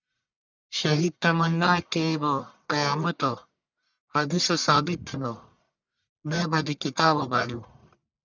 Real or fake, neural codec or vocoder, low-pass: fake; codec, 44.1 kHz, 1.7 kbps, Pupu-Codec; 7.2 kHz